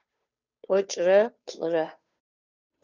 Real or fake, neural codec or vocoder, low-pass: fake; codec, 16 kHz, 2 kbps, FunCodec, trained on Chinese and English, 25 frames a second; 7.2 kHz